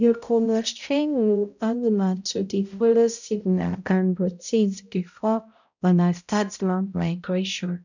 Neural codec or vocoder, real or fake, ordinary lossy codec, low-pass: codec, 16 kHz, 0.5 kbps, X-Codec, HuBERT features, trained on balanced general audio; fake; none; 7.2 kHz